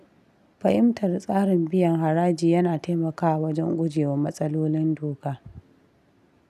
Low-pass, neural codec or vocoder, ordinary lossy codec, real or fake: 14.4 kHz; none; none; real